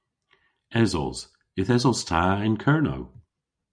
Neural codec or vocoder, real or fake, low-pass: none; real; 9.9 kHz